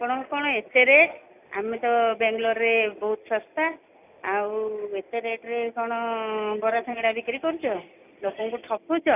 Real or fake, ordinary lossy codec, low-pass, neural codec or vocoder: real; none; 3.6 kHz; none